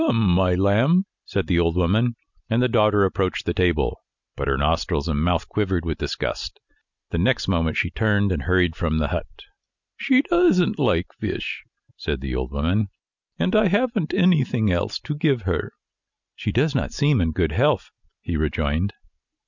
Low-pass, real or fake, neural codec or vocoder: 7.2 kHz; real; none